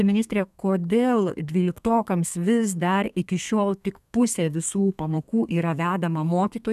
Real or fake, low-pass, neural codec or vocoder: fake; 14.4 kHz; codec, 32 kHz, 1.9 kbps, SNAC